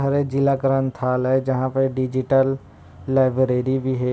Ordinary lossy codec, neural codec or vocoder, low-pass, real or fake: none; none; none; real